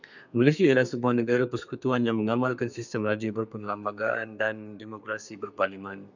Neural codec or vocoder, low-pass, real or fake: codec, 32 kHz, 1.9 kbps, SNAC; 7.2 kHz; fake